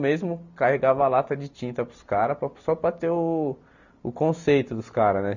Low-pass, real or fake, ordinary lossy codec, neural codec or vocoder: 7.2 kHz; real; none; none